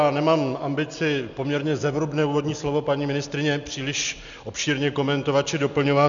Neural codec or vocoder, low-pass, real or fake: none; 7.2 kHz; real